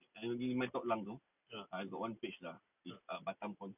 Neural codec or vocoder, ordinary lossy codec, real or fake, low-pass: none; none; real; 3.6 kHz